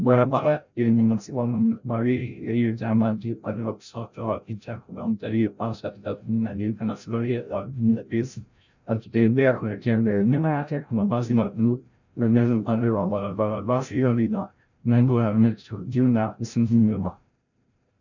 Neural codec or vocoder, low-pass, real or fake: codec, 16 kHz, 0.5 kbps, FreqCodec, larger model; 7.2 kHz; fake